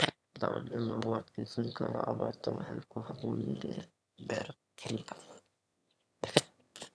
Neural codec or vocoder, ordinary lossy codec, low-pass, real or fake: autoencoder, 22.05 kHz, a latent of 192 numbers a frame, VITS, trained on one speaker; none; none; fake